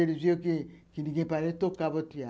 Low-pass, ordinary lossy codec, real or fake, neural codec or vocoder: none; none; real; none